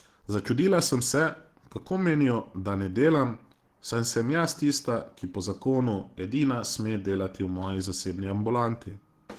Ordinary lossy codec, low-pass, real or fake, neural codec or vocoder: Opus, 16 kbps; 14.4 kHz; fake; codec, 44.1 kHz, 7.8 kbps, DAC